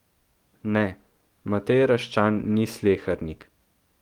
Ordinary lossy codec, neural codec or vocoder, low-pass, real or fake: Opus, 24 kbps; codec, 44.1 kHz, 7.8 kbps, DAC; 19.8 kHz; fake